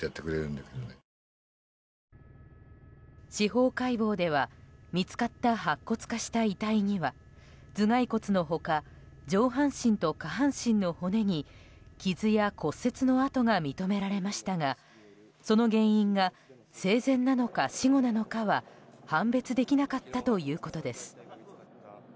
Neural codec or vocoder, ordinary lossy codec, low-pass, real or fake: none; none; none; real